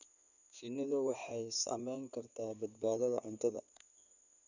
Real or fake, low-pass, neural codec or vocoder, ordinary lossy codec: fake; 7.2 kHz; codec, 16 kHz in and 24 kHz out, 2.2 kbps, FireRedTTS-2 codec; none